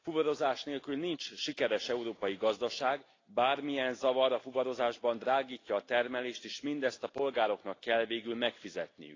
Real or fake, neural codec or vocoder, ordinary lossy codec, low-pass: real; none; AAC, 32 kbps; 7.2 kHz